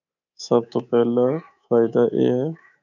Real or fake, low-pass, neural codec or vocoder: fake; 7.2 kHz; codec, 24 kHz, 3.1 kbps, DualCodec